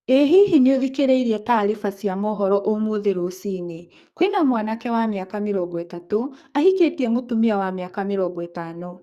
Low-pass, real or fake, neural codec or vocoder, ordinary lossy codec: 14.4 kHz; fake; codec, 44.1 kHz, 2.6 kbps, SNAC; Opus, 64 kbps